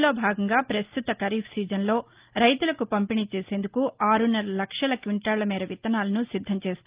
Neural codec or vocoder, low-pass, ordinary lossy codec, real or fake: none; 3.6 kHz; Opus, 32 kbps; real